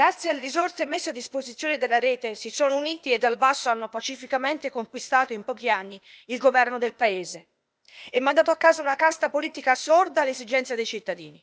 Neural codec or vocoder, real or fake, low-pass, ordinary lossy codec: codec, 16 kHz, 0.8 kbps, ZipCodec; fake; none; none